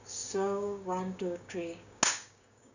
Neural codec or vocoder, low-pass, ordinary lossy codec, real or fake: codec, 16 kHz, 6 kbps, DAC; 7.2 kHz; none; fake